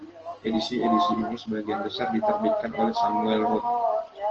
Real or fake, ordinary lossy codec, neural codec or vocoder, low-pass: real; Opus, 16 kbps; none; 7.2 kHz